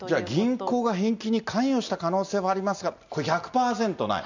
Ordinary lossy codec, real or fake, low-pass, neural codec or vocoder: none; real; 7.2 kHz; none